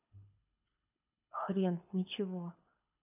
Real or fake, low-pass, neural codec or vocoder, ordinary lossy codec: fake; 3.6 kHz; codec, 24 kHz, 6 kbps, HILCodec; none